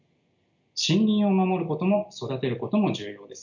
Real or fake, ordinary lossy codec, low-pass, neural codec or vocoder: real; none; 7.2 kHz; none